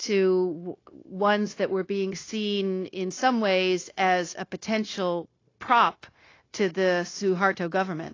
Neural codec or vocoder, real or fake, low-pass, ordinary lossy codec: codec, 16 kHz, 0.9 kbps, LongCat-Audio-Codec; fake; 7.2 kHz; AAC, 32 kbps